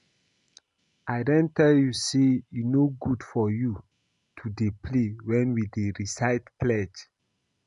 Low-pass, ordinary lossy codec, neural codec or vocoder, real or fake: 10.8 kHz; none; none; real